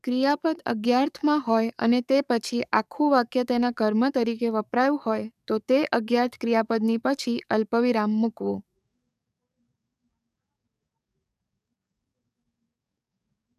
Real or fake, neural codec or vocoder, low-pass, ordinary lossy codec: fake; codec, 44.1 kHz, 7.8 kbps, DAC; 14.4 kHz; none